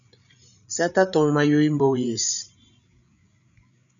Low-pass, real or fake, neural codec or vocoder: 7.2 kHz; fake; codec, 16 kHz, 8 kbps, FreqCodec, larger model